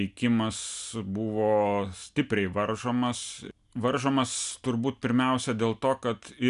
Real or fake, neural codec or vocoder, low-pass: real; none; 10.8 kHz